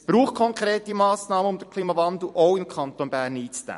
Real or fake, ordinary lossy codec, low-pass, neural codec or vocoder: fake; MP3, 48 kbps; 14.4 kHz; vocoder, 44.1 kHz, 128 mel bands every 256 samples, BigVGAN v2